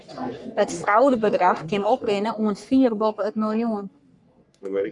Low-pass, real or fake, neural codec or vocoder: 10.8 kHz; fake; codec, 44.1 kHz, 3.4 kbps, Pupu-Codec